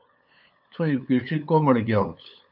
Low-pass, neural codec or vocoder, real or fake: 5.4 kHz; codec, 16 kHz, 8 kbps, FunCodec, trained on LibriTTS, 25 frames a second; fake